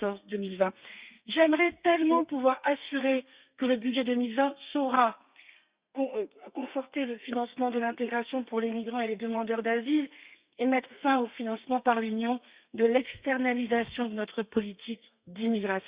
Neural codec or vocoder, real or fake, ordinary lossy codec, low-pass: codec, 32 kHz, 1.9 kbps, SNAC; fake; Opus, 64 kbps; 3.6 kHz